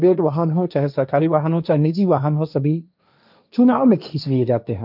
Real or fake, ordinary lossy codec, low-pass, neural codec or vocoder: fake; none; 5.4 kHz; codec, 16 kHz, 1.1 kbps, Voila-Tokenizer